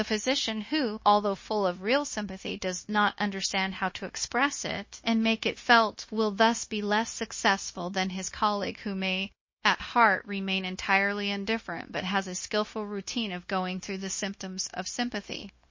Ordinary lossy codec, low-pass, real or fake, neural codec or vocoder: MP3, 32 kbps; 7.2 kHz; fake; codec, 16 kHz, 0.9 kbps, LongCat-Audio-Codec